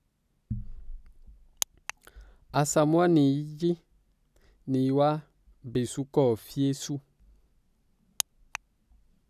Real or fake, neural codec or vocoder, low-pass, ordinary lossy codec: real; none; 14.4 kHz; none